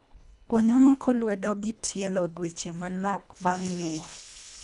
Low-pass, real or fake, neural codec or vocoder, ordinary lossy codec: 10.8 kHz; fake; codec, 24 kHz, 1.5 kbps, HILCodec; none